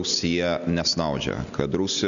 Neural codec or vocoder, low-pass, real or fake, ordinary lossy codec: none; 7.2 kHz; real; MP3, 96 kbps